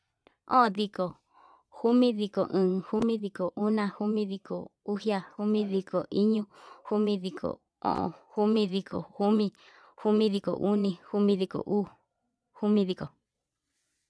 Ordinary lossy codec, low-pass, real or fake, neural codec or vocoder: none; none; fake; vocoder, 22.05 kHz, 80 mel bands, Vocos